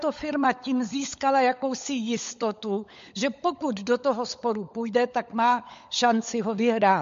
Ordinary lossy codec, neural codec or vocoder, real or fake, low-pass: MP3, 48 kbps; codec, 16 kHz, 16 kbps, FunCodec, trained on LibriTTS, 50 frames a second; fake; 7.2 kHz